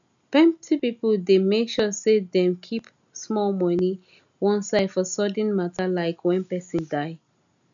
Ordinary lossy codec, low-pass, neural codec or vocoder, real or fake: none; 7.2 kHz; none; real